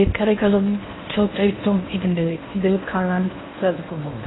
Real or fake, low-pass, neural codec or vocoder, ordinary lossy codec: fake; 7.2 kHz; codec, 16 kHz in and 24 kHz out, 0.6 kbps, FocalCodec, streaming, 4096 codes; AAC, 16 kbps